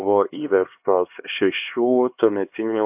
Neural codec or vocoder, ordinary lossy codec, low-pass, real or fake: codec, 16 kHz, 2 kbps, X-Codec, HuBERT features, trained on LibriSpeech; AAC, 32 kbps; 3.6 kHz; fake